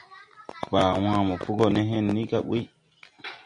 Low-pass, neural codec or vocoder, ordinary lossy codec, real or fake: 9.9 kHz; none; MP3, 48 kbps; real